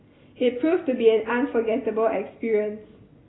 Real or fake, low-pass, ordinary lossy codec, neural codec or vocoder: real; 7.2 kHz; AAC, 16 kbps; none